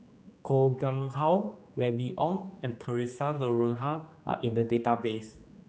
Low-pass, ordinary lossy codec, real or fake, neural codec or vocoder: none; none; fake; codec, 16 kHz, 2 kbps, X-Codec, HuBERT features, trained on general audio